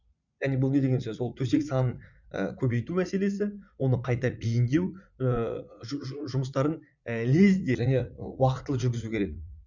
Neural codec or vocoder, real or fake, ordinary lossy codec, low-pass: vocoder, 44.1 kHz, 80 mel bands, Vocos; fake; none; 7.2 kHz